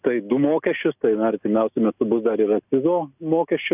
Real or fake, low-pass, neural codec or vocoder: real; 3.6 kHz; none